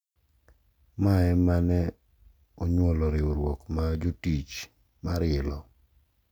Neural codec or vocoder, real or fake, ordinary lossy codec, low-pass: none; real; none; none